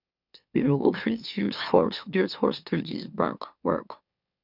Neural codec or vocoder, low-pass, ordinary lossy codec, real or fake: autoencoder, 44.1 kHz, a latent of 192 numbers a frame, MeloTTS; 5.4 kHz; none; fake